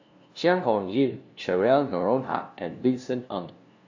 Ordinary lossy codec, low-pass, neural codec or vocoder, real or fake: AAC, 48 kbps; 7.2 kHz; codec, 16 kHz, 0.5 kbps, FunCodec, trained on LibriTTS, 25 frames a second; fake